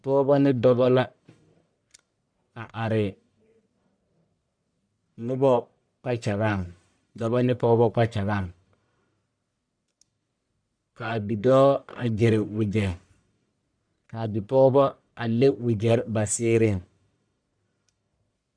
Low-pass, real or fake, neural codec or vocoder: 9.9 kHz; fake; codec, 44.1 kHz, 1.7 kbps, Pupu-Codec